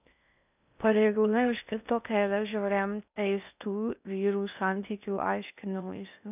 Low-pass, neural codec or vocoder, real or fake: 3.6 kHz; codec, 16 kHz in and 24 kHz out, 0.6 kbps, FocalCodec, streaming, 4096 codes; fake